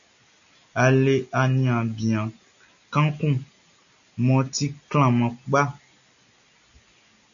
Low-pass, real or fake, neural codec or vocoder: 7.2 kHz; real; none